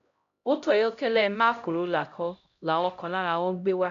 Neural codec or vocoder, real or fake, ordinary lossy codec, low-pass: codec, 16 kHz, 0.5 kbps, X-Codec, HuBERT features, trained on LibriSpeech; fake; none; 7.2 kHz